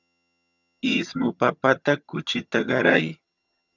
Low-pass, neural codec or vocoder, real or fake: 7.2 kHz; vocoder, 22.05 kHz, 80 mel bands, HiFi-GAN; fake